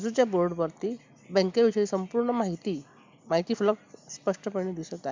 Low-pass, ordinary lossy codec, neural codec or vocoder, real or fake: 7.2 kHz; MP3, 64 kbps; none; real